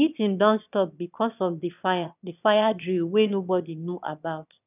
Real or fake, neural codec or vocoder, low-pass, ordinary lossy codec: fake; autoencoder, 22.05 kHz, a latent of 192 numbers a frame, VITS, trained on one speaker; 3.6 kHz; none